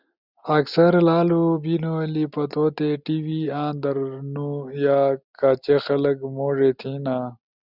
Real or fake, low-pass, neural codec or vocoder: real; 5.4 kHz; none